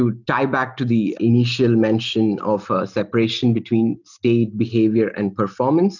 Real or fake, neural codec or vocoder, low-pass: real; none; 7.2 kHz